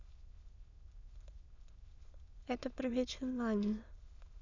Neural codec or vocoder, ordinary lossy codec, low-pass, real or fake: autoencoder, 22.05 kHz, a latent of 192 numbers a frame, VITS, trained on many speakers; Opus, 64 kbps; 7.2 kHz; fake